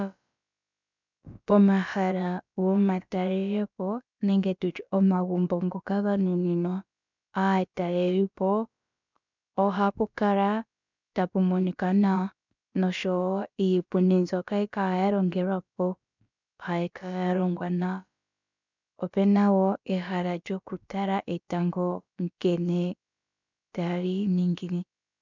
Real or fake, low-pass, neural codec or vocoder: fake; 7.2 kHz; codec, 16 kHz, about 1 kbps, DyCAST, with the encoder's durations